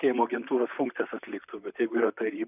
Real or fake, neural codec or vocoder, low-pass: fake; vocoder, 44.1 kHz, 128 mel bands, Pupu-Vocoder; 3.6 kHz